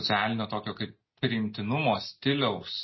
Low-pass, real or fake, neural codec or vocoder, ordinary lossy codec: 7.2 kHz; real; none; MP3, 24 kbps